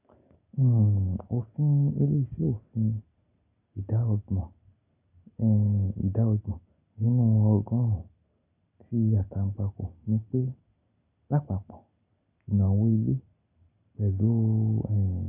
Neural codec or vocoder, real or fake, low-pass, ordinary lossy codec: none; real; 3.6 kHz; none